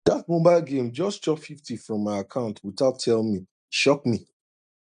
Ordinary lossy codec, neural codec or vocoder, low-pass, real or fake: none; none; 9.9 kHz; real